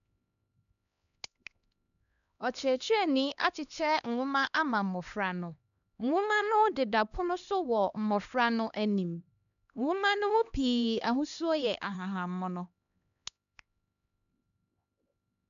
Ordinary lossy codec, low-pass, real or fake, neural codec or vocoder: none; 7.2 kHz; fake; codec, 16 kHz, 2 kbps, X-Codec, HuBERT features, trained on LibriSpeech